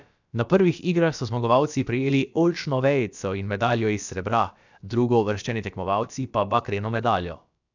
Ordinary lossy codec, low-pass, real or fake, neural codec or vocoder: none; 7.2 kHz; fake; codec, 16 kHz, about 1 kbps, DyCAST, with the encoder's durations